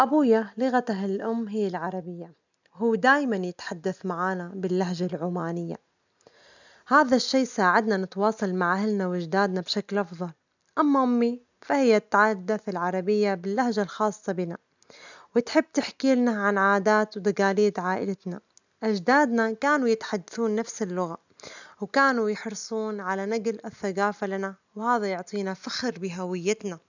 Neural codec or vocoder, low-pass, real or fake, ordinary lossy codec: none; 7.2 kHz; real; none